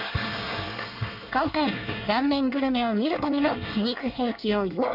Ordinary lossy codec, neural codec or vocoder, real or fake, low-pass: none; codec, 24 kHz, 1 kbps, SNAC; fake; 5.4 kHz